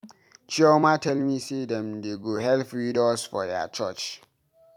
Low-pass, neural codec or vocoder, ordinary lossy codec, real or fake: 19.8 kHz; vocoder, 44.1 kHz, 128 mel bands every 256 samples, BigVGAN v2; none; fake